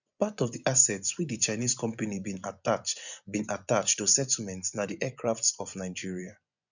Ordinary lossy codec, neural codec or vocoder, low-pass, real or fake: none; vocoder, 24 kHz, 100 mel bands, Vocos; 7.2 kHz; fake